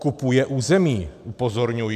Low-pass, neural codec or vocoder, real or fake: 14.4 kHz; none; real